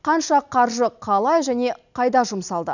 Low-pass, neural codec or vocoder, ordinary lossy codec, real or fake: 7.2 kHz; none; none; real